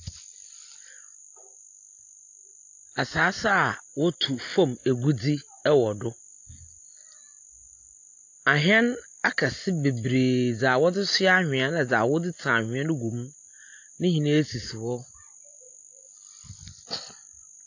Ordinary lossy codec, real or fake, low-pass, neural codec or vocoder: AAC, 48 kbps; real; 7.2 kHz; none